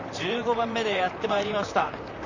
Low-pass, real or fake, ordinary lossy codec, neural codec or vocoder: 7.2 kHz; fake; none; vocoder, 44.1 kHz, 128 mel bands, Pupu-Vocoder